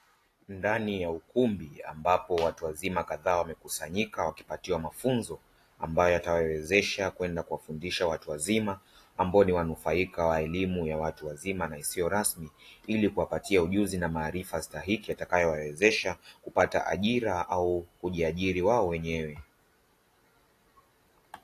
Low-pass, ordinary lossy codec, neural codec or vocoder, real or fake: 14.4 kHz; AAC, 48 kbps; none; real